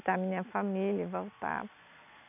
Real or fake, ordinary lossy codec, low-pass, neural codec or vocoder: real; none; 3.6 kHz; none